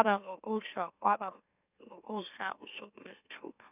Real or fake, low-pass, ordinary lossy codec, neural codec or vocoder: fake; 3.6 kHz; none; autoencoder, 44.1 kHz, a latent of 192 numbers a frame, MeloTTS